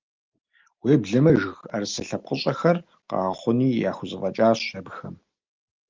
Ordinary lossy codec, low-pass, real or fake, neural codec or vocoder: Opus, 24 kbps; 7.2 kHz; real; none